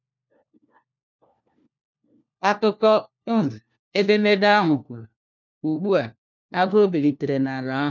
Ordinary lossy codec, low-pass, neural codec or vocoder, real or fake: none; 7.2 kHz; codec, 16 kHz, 1 kbps, FunCodec, trained on LibriTTS, 50 frames a second; fake